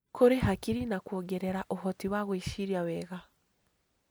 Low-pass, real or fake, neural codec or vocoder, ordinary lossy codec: none; real; none; none